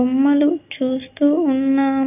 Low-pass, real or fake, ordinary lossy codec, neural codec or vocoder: 3.6 kHz; real; none; none